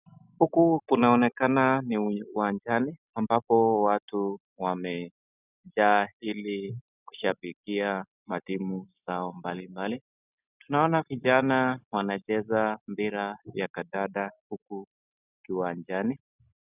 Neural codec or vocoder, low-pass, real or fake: none; 3.6 kHz; real